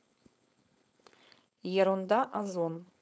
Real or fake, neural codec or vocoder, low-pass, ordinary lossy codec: fake; codec, 16 kHz, 4.8 kbps, FACodec; none; none